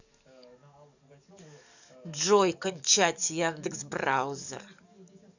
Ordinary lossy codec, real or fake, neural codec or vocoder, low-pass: none; fake; codec, 44.1 kHz, 7.8 kbps, DAC; 7.2 kHz